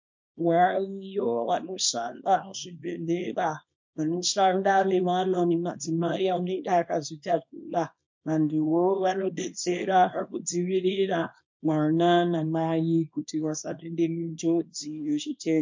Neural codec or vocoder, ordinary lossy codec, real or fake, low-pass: codec, 24 kHz, 0.9 kbps, WavTokenizer, small release; MP3, 48 kbps; fake; 7.2 kHz